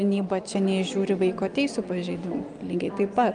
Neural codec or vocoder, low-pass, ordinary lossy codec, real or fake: none; 9.9 kHz; Opus, 32 kbps; real